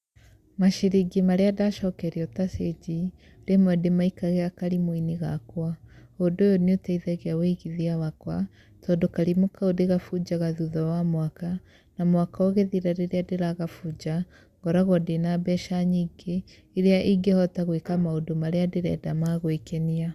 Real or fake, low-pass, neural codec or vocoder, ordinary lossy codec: real; 14.4 kHz; none; Opus, 64 kbps